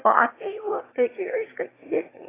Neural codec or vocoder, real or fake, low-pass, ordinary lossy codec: autoencoder, 22.05 kHz, a latent of 192 numbers a frame, VITS, trained on one speaker; fake; 3.6 kHz; AAC, 16 kbps